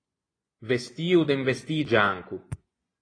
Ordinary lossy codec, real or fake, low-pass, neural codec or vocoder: AAC, 32 kbps; fake; 9.9 kHz; vocoder, 24 kHz, 100 mel bands, Vocos